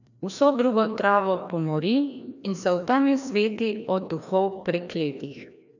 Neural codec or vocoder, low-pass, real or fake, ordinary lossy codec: codec, 16 kHz, 1 kbps, FreqCodec, larger model; 7.2 kHz; fake; none